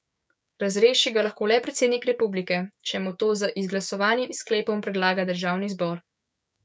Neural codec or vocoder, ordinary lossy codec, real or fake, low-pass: codec, 16 kHz, 6 kbps, DAC; none; fake; none